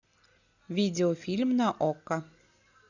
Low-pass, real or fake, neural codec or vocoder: 7.2 kHz; real; none